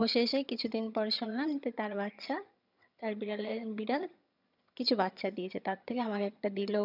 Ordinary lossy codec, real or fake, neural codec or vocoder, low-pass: none; fake; vocoder, 22.05 kHz, 80 mel bands, HiFi-GAN; 5.4 kHz